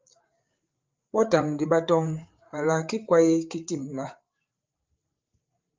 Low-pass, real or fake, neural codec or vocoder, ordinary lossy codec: 7.2 kHz; fake; codec, 16 kHz, 8 kbps, FreqCodec, larger model; Opus, 24 kbps